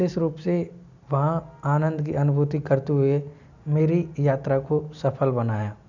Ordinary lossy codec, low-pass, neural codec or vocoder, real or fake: none; 7.2 kHz; none; real